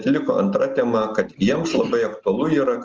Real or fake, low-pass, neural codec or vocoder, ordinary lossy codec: real; 7.2 kHz; none; Opus, 24 kbps